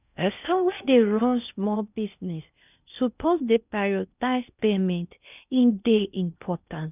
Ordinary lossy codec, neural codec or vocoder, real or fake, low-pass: none; codec, 16 kHz in and 24 kHz out, 0.6 kbps, FocalCodec, streaming, 4096 codes; fake; 3.6 kHz